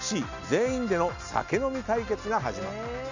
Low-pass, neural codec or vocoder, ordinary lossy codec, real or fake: 7.2 kHz; none; none; real